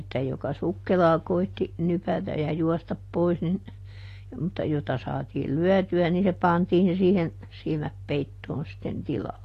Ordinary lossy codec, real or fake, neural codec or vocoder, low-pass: AAC, 48 kbps; real; none; 14.4 kHz